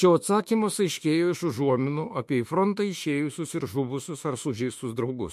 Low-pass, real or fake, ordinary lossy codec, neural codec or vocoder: 14.4 kHz; fake; MP3, 64 kbps; autoencoder, 48 kHz, 32 numbers a frame, DAC-VAE, trained on Japanese speech